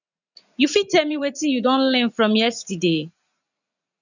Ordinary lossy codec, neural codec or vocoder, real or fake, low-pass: none; vocoder, 44.1 kHz, 80 mel bands, Vocos; fake; 7.2 kHz